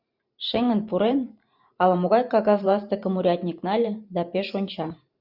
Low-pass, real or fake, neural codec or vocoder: 5.4 kHz; fake; vocoder, 44.1 kHz, 128 mel bands every 512 samples, BigVGAN v2